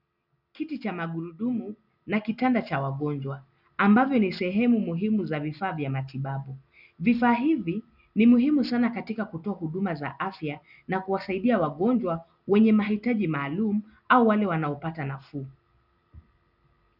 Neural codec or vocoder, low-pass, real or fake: none; 5.4 kHz; real